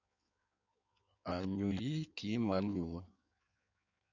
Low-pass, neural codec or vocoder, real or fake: 7.2 kHz; codec, 16 kHz in and 24 kHz out, 1.1 kbps, FireRedTTS-2 codec; fake